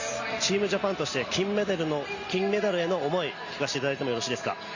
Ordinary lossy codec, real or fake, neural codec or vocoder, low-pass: Opus, 64 kbps; real; none; 7.2 kHz